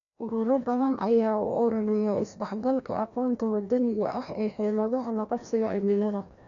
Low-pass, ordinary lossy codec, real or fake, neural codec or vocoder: 7.2 kHz; none; fake; codec, 16 kHz, 1 kbps, FreqCodec, larger model